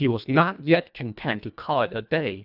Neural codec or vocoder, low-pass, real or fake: codec, 24 kHz, 1.5 kbps, HILCodec; 5.4 kHz; fake